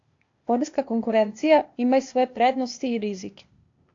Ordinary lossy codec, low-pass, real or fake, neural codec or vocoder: AAC, 48 kbps; 7.2 kHz; fake; codec, 16 kHz, 0.8 kbps, ZipCodec